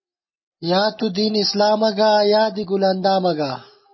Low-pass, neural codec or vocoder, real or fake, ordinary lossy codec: 7.2 kHz; none; real; MP3, 24 kbps